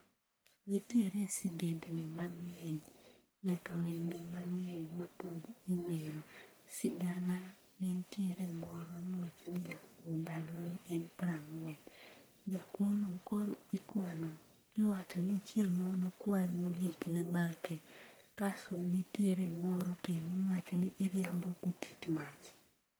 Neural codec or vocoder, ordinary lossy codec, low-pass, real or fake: codec, 44.1 kHz, 1.7 kbps, Pupu-Codec; none; none; fake